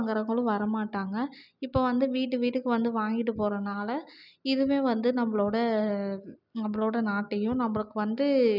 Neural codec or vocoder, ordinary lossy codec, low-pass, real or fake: none; none; 5.4 kHz; real